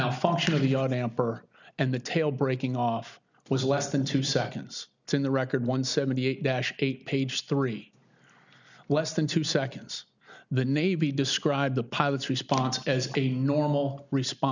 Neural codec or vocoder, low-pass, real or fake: none; 7.2 kHz; real